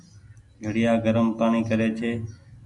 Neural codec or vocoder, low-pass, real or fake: none; 10.8 kHz; real